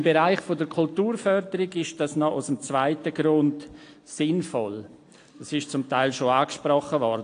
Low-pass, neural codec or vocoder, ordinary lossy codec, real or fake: 9.9 kHz; none; AAC, 48 kbps; real